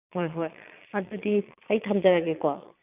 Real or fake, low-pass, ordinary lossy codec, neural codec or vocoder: fake; 3.6 kHz; none; vocoder, 22.05 kHz, 80 mel bands, Vocos